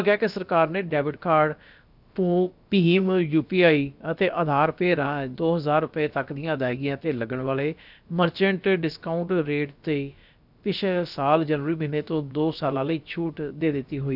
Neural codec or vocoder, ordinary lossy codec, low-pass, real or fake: codec, 16 kHz, about 1 kbps, DyCAST, with the encoder's durations; none; 5.4 kHz; fake